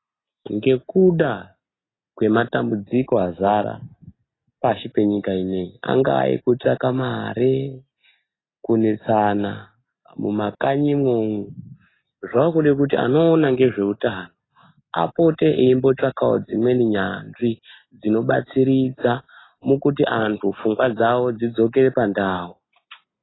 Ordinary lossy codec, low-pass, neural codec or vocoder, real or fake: AAC, 16 kbps; 7.2 kHz; none; real